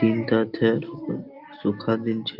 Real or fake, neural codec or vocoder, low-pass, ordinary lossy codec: real; none; 5.4 kHz; Opus, 32 kbps